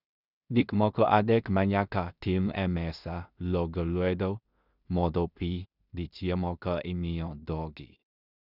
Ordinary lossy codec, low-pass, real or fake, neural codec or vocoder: none; 5.4 kHz; fake; codec, 16 kHz in and 24 kHz out, 0.4 kbps, LongCat-Audio-Codec, two codebook decoder